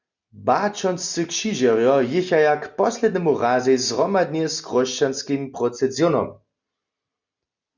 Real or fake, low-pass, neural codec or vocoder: real; 7.2 kHz; none